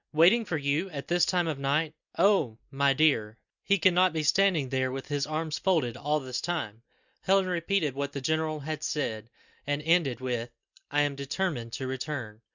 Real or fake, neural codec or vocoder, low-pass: real; none; 7.2 kHz